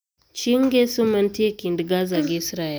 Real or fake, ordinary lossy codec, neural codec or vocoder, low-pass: real; none; none; none